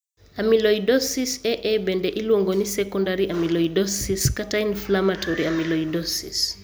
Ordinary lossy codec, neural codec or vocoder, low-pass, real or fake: none; none; none; real